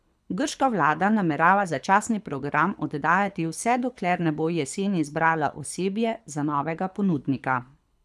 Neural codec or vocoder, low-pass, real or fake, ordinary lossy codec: codec, 24 kHz, 6 kbps, HILCodec; none; fake; none